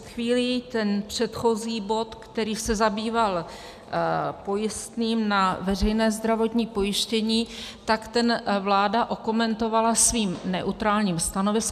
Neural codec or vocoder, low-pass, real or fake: none; 14.4 kHz; real